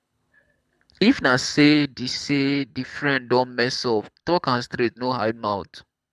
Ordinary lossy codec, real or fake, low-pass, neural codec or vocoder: none; fake; none; codec, 24 kHz, 6 kbps, HILCodec